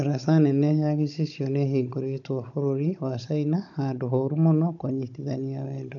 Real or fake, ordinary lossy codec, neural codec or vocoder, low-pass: fake; none; codec, 16 kHz, 16 kbps, FunCodec, trained on Chinese and English, 50 frames a second; 7.2 kHz